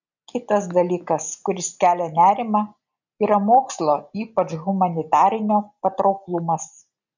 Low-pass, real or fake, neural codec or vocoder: 7.2 kHz; real; none